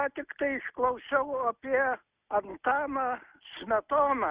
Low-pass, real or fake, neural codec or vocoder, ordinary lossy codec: 3.6 kHz; real; none; Opus, 64 kbps